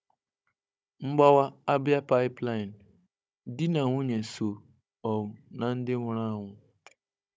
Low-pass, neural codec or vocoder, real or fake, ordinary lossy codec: none; codec, 16 kHz, 16 kbps, FunCodec, trained on Chinese and English, 50 frames a second; fake; none